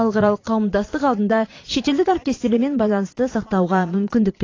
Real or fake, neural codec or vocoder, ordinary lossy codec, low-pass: fake; vocoder, 22.05 kHz, 80 mel bands, Vocos; AAC, 32 kbps; 7.2 kHz